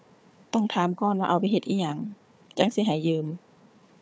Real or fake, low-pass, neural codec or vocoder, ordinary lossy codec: fake; none; codec, 16 kHz, 4 kbps, FunCodec, trained on Chinese and English, 50 frames a second; none